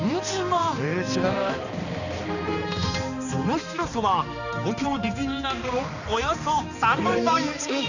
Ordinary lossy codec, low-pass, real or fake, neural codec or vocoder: none; 7.2 kHz; fake; codec, 16 kHz, 2 kbps, X-Codec, HuBERT features, trained on balanced general audio